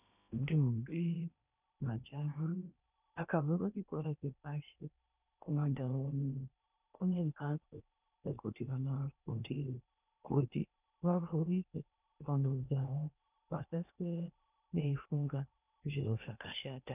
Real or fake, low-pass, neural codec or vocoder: fake; 3.6 kHz; codec, 16 kHz in and 24 kHz out, 0.8 kbps, FocalCodec, streaming, 65536 codes